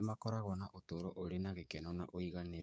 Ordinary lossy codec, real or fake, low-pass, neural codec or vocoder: none; fake; none; codec, 16 kHz, 6 kbps, DAC